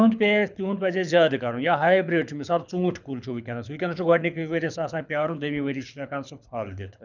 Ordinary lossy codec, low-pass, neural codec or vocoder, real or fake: none; 7.2 kHz; codec, 24 kHz, 6 kbps, HILCodec; fake